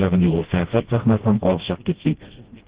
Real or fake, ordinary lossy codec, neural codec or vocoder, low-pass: fake; Opus, 16 kbps; codec, 16 kHz, 1 kbps, FreqCodec, smaller model; 3.6 kHz